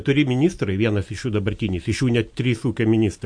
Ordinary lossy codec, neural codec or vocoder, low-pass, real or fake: MP3, 48 kbps; none; 9.9 kHz; real